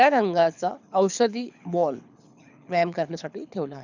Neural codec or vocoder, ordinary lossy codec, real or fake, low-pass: codec, 24 kHz, 6 kbps, HILCodec; none; fake; 7.2 kHz